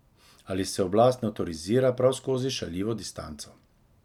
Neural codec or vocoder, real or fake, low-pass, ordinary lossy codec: none; real; 19.8 kHz; none